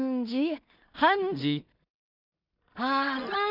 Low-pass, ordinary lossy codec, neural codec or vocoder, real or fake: 5.4 kHz; none; codec, 16 kHz in and 24 kHz out, 0.4 kbps, LongCat-Audio-Codec, two codebook decoder; fake